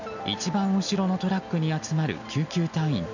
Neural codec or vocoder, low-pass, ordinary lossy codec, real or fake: none; 7.2 kHz; none; real